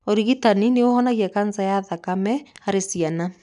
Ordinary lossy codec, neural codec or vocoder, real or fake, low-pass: none; none; real; 10.8 kHz